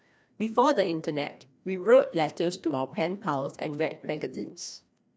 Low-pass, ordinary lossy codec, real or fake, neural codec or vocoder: none; none; fake; codec, 16 kHz, 1 kbps, FreqCodec, larger model